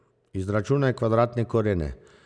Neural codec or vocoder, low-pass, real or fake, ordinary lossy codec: none; 9.9 kHz; real; none